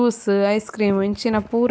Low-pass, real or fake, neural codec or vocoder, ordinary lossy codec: none; real; none; none